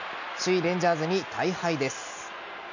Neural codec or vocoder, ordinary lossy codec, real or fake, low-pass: none; none; real; 7.2 kHz